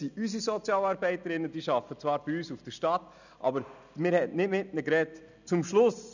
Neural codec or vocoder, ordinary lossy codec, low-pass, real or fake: none; none; 7.2 kHz; real